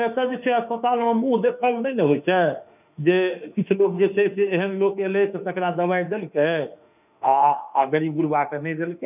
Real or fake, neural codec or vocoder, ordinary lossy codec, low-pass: fake; autoencoder, 48 kHz, 32 numbers a frame, DAC-VAE, trained on Japanese speech; none; 3.6 kHz